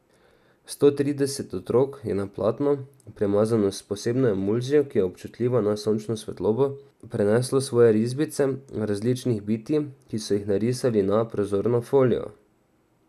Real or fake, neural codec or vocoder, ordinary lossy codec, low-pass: real; none; none; 14.4 kHz